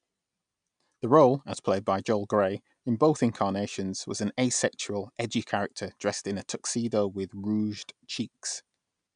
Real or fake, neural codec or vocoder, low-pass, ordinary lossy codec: real; none; 9.9 kHz; none